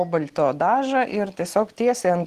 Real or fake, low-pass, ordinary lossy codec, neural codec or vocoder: real; 14.4 kHz; Opus, 16 kbps; none